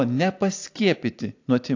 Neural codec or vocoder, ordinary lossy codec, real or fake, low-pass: none; AAC, 48 kbps; real; 7.2 kHz